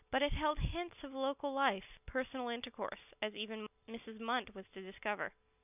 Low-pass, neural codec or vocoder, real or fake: 3.6 kHz; none; real